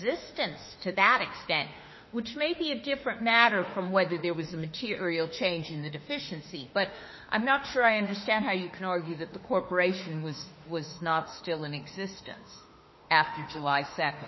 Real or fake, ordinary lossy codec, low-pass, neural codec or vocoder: fake; MP3, 24 kbps; 7.2 kHz; autoencoder, 48 kHz, 32 numbers a frame, DAC-VAE, trained on Japanese speech